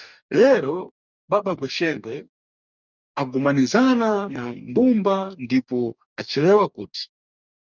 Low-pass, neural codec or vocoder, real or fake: 7.2 kHz; codec, 44.1 kHz, 2.6 kbps, DAC; fake